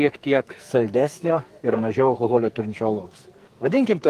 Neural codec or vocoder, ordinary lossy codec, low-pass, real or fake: codec, 44.1 kHz, 2.6 kbps, SNAC; Opus, 32 kbps; 14.4 kHz; fake